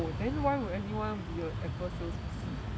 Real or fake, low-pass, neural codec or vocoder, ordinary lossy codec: real; none; none; none